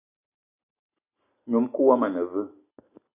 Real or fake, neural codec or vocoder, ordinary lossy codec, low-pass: real; none; AAC, 32 kbps; 3.6 kHz